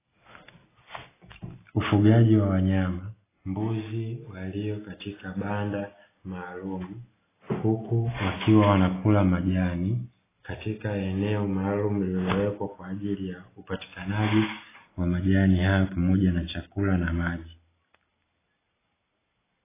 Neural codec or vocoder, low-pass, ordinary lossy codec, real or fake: none; 3.6 kHz; AAC, 16 kbps; real